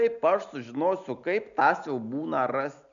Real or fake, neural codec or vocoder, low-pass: real; none; 7.2 kHz